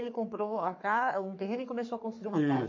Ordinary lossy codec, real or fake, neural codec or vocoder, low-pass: MP3, 48 kbps; fake; codec, 44.1 kHz, 3.4 kbps, Pupu-Codec; 7.2 kHz